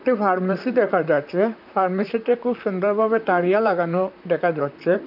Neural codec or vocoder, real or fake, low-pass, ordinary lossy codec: vocoder, 44.1 kHz, 128 mel bands, Pupu-Vocoder; fake; 5.4 kHz; none